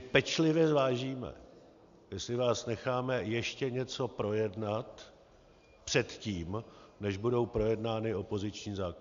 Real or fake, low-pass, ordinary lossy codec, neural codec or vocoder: real; 7.2 kHz; AAC, 96 kbps; none